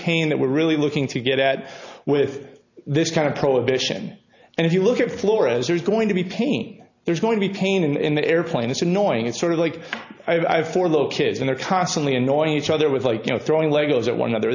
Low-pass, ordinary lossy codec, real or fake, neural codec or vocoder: 7.2 kHz; AAC, 48 kbps; real; none